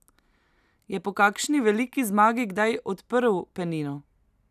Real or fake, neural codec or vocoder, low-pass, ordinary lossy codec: real; none; 14.4 kHz; none